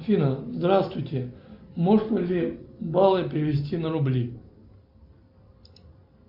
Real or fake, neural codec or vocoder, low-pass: real; none; 5.4 kHz